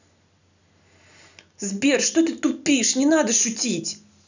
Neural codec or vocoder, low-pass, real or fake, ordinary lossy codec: none; 7.2 kHz; real; none